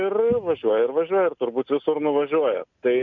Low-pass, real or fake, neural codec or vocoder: 7.2 kHz; real; none